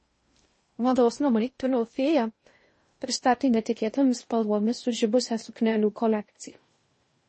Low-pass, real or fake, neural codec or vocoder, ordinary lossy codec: 10.8 kHz; fake; codec, 16 kHz in and 24 kHz out, 0.6 kbps, FocalCodec, streaming, 2048 codes; MP3, 32 kbps